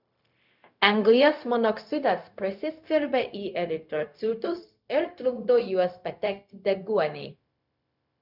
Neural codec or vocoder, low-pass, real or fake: codec, 16 kHz, 0.4 kbps, LongCat-Audio-Codec; 5.4 kHz; fake